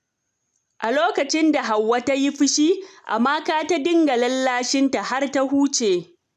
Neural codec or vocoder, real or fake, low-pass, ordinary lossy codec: none; real; 14.4 kHz; none